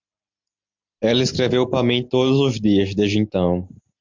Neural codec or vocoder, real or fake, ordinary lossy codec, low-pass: none; real; MP3, 64 kbps; 7.2 kHz